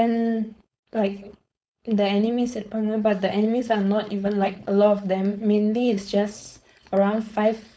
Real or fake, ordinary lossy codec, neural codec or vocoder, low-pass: fake; none; codec, 16 kHz, 4.8 kbps, FACodec; none